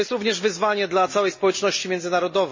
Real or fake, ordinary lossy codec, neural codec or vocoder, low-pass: real; MP3, 32 kbps; none; 7.2 kHz